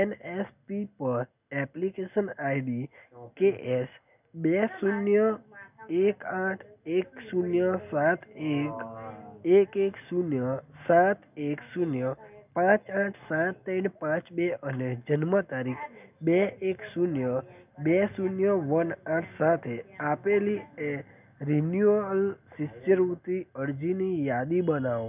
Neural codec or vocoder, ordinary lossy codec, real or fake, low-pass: none; none; real; 3.6 kHz